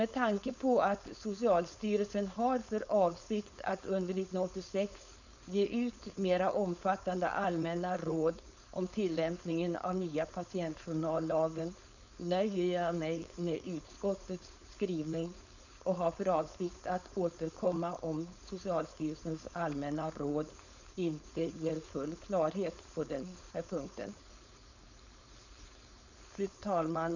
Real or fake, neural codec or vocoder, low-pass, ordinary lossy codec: fake; codec, 16 kHz, 4.8 kbps, FACodec; 7.2 kHz; none